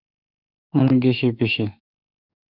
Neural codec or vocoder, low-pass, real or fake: autoencoder, 48 kHz, 32 numbers a frame, DAC-VAE, trained on Japanese speech; 5.4 kHz; fake